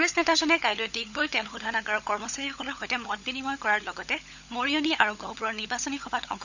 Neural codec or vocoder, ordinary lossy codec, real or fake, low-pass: codec, 16 kHz, 16 kbps, FunCodec, trained on LibriTTS, 50 frames a second; none; fake; 7.2 kHz